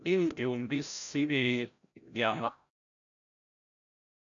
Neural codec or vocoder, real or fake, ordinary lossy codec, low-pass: codec, 16 kHz, 0.5 kbps, FreqCodec, larger model; fake; MP3, 96 kbps; 7.2 kHz